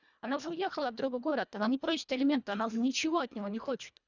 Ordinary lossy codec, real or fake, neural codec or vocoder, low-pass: none; fake; codec, 24 kHz, 1.5 kbps, HILCodec; 7.2 kHz